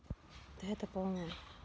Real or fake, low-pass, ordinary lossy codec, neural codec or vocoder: real; none; none; none